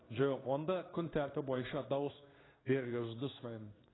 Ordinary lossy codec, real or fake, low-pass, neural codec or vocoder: AAC, 16 kbps; fake; 7.2 kHz; codec, 16 kHz in and 24 kHz out, 1 kbps, XY-Tokenizer